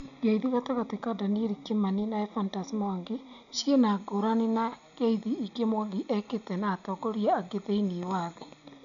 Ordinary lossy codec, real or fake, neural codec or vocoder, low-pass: none; real; none; 7.2 kHz